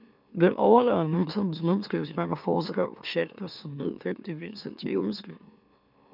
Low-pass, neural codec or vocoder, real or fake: 5.4 kHz; autoencoder, 44.1 kHz, a latent of 192 numbers a frame, MeloTTS; fake